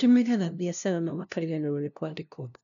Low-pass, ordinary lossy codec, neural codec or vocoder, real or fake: 7.2 kHz; none; codec, 16 kHz, 0.5 kbps, FunCodec, trained on LibriTTS, 25 frames a second; fake